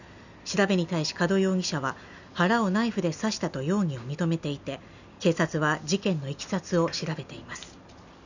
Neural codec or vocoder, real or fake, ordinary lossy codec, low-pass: none; real; none; 7.2 kHz